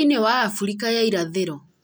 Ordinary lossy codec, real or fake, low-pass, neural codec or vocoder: none; real; none; none